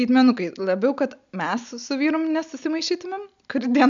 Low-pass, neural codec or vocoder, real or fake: 7.2 kHz; none; real